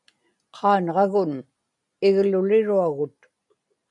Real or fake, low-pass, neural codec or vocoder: real; 10.8 kHz; none